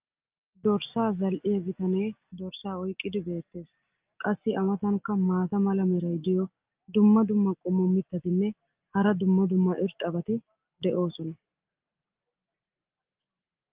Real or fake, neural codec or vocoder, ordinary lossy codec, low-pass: real; none; Opus, 32 kbps; 3.6 kHz